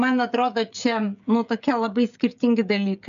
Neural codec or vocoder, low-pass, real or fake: codec, 16 kHz, 16 kbps, FreqCodec, smaller model; 7.2 kHz; fake